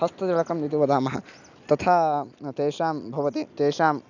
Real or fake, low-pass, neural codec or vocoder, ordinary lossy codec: real; 7.2 kHz; none; none